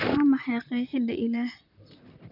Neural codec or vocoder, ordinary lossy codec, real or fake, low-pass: vocoder, 44.1 kHz, 128 mel bands, Pupu-Vocoder; none; fake; 5.4 kHz